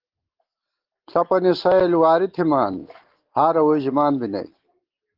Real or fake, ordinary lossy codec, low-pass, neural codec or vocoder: real; Opus, 16 kbps; 5.4 kHz; none